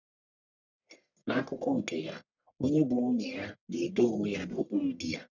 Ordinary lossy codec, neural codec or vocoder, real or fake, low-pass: none; codec, 44.1 kHz, 1.7 kbps, Pupu-Codec; fake; 7.2 kHz